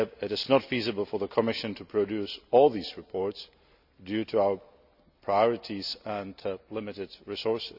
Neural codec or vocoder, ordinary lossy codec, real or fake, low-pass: none; none; real; 5.4 kHz